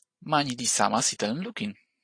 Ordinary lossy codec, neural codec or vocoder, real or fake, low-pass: AAC, 48 kbps; none; real; 9.9 kHz